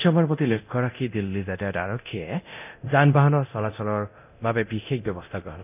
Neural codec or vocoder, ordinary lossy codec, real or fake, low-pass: codec, 24 kHz, 0.9 kbps, DualCodec; none; fake; 3.6 kHz